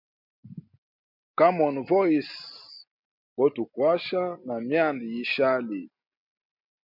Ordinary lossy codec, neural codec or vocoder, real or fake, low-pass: MP3, 48 kbps; none; real; 5.4 kHz